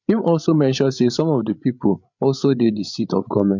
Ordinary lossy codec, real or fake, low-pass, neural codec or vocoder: MP3, 64 kbps; fake; 7.2 kHz; codec, 16 kHz, 8 kbps, FreqCodec, larger model